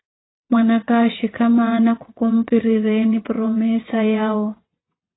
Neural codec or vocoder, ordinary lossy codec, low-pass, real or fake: vocoder, 22.05 kHz, 80 mel bands, WaveNeXt; AAC, 16 kbps; 7.2 kHz; fake